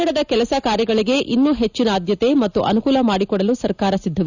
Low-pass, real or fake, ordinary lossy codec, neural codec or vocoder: 7.2 kHz; real; none; none